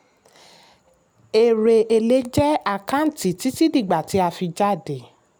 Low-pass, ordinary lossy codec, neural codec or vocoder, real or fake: none; none; none; real